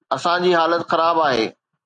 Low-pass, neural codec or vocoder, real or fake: 9.9 kHz; none; real